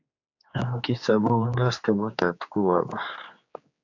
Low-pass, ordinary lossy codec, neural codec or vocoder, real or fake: 7.2 kHz; AAC, 48 kbps; codec, 16 kHz, 4 kbps, X-Codec, HuBERT features, trained on general audio; fake